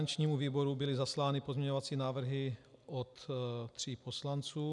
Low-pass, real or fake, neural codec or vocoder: 10.8 kHz; real; none